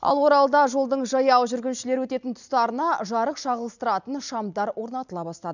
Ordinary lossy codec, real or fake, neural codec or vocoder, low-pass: none; real; none; 7.2 kHz